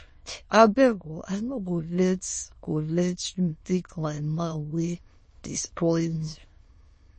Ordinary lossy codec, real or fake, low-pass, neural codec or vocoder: MP3, 32 kbps; fake; 9.9 kHz; autoencoder, 22.05 kHz, a latent of 192 numbers a frame, VITS, trained on many speakers